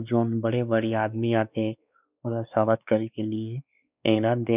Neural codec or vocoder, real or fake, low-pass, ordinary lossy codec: codec, 16 kHz, 2 kbps, X-Codec, WavLM features, trained on Multilingual LibriSpeech; fake; 3.6 kHz; none